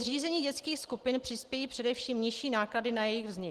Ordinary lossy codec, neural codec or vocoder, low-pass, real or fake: Opus, 16 kbps; none; 14.4 kHz; real